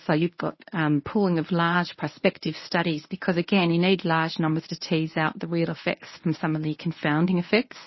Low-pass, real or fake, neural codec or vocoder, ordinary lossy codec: 7.2 kHz; fake; codec, 24 kHz, 0.9 kbps, WavTokenizer, medium speech release version 1; MP3, 24 kbps